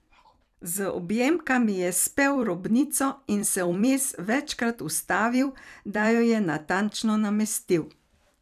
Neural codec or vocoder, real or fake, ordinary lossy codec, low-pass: vocoder, 44.1 kHz, 128 mel bands every 256 samples, BigVGAN v2; fake; none; 14.4 kHz